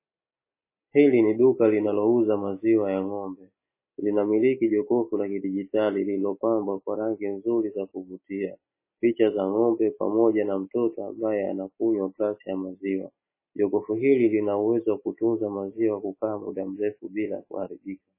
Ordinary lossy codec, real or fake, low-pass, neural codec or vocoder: MP3, 16 kbps; real; 3.6 kHz; none